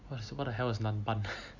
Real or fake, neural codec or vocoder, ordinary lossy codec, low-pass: real; none; none; 7.2 kHz